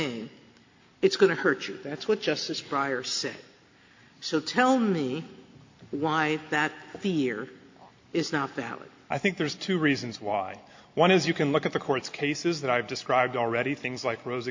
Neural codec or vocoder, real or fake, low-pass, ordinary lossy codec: none; real; 7.2 kHz; MP3, 48 kbps